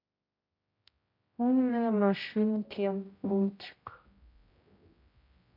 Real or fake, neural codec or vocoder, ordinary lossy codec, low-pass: fake; codec, 16 kHz, 0.5 kbps, X-Codec, HuBERT features, trained on general audio; MP3, 32 kbps; 5.4 kHz